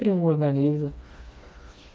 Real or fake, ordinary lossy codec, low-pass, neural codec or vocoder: fake; none; none; codec, 16 kHz, 2 kbps, FreqCodec, smaller model